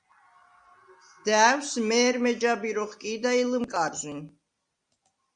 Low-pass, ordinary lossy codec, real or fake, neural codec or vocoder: 9.9 kHz; Opus, 64 kbps; real; none